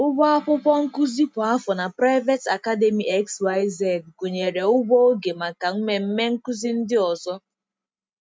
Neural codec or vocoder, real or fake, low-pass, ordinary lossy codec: none; real; none; none